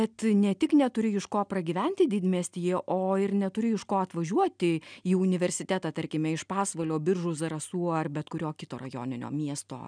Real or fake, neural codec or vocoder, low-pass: real; none; 9.9 kHz